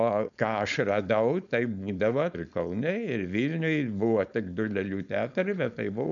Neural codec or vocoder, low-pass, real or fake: codec, 16 kHz, 4.8 kbps, FACodec; 7.2 kHz; fake